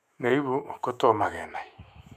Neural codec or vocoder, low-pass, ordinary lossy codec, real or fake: autoencoder, 48 kHz, 128 numbers a frame, DAC-VAE, trained on Japanese speech; 14.4 kHz; none; fake